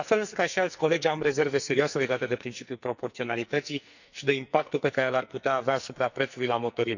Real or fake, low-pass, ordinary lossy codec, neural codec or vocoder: fake; 7.2 kHz; none; codec, 44.1 kHz, 2.6 kbps, SNAC